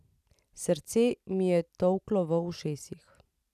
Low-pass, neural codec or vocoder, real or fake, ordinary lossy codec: 14.4 kHz; none; real; none